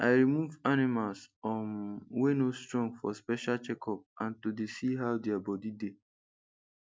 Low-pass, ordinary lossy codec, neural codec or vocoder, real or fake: none; none; none; real